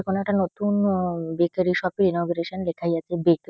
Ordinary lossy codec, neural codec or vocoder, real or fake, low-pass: none; none; real; none